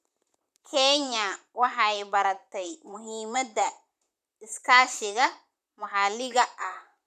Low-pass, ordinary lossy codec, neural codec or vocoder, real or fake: 14.4 kHz; none; vocoder, 44.1 kHz, 128 mel bands, Pupu-Vocoder; fake